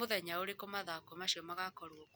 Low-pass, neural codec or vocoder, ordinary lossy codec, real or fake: none; none; none; real